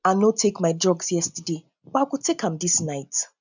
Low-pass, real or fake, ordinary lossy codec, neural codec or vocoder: 7.2 kHz; real; none; none